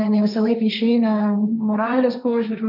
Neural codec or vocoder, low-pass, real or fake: codec, 16 kHz, 1.1 kbps, Voila-Tokenizer; 5.4 kHz; fake